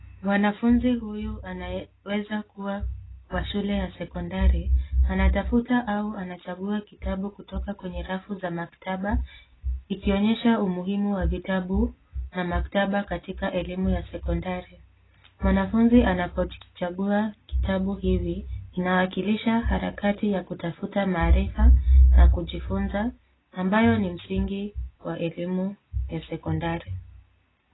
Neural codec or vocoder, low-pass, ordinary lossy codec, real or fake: none; 7.2 kHz; AAC, 16 kbps; real